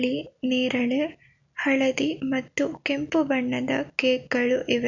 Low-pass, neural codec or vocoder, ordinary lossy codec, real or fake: 7.2 kHz; none; none; real